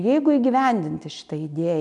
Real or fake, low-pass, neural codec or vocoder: real; 10.8 kHz; none